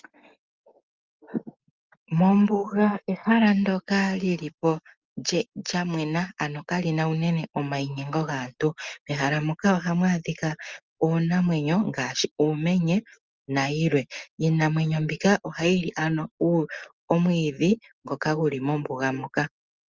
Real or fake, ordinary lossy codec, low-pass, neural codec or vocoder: real; Opus, 32 kbps; 7.2 kHz; none